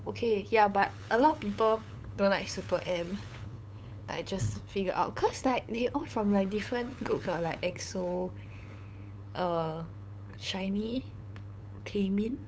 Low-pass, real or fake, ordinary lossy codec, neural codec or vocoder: none; fake; none; codec, 16 kHz, 8 kbps, FunCodec, trained on LibriTTS, 25 frames a second